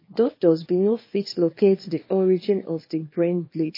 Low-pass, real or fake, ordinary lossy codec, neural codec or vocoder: 5.4 kHz; fake; MP3, 24 kbps; codec, 24 kHz, 0.9 kbps, WavTokenizer, small release